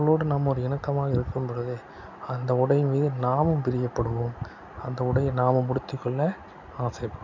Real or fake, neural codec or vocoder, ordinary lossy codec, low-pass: real; none; MP3, 64 kbps; 7.2 kHz